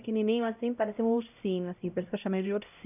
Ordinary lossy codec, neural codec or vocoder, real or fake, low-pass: none; codec, 16 kHz, 0.5 kbps, X-Codec, HuBERT features, trained on LibriSpeech; fake; 3.6 kHz